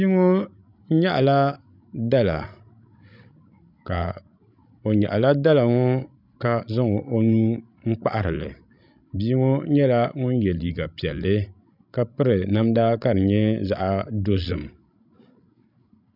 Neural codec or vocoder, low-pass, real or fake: codec, 16 kHz, 16 kbps, FreqCodec, larger model; 5.4 kHz; fake